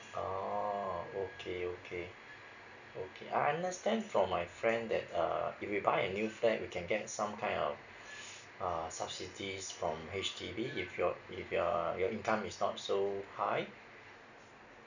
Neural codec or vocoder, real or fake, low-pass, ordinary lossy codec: none; real; 7.2 kHz; none